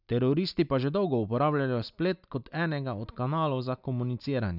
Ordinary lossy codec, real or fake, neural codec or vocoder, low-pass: none; real; none; 5.4 kHz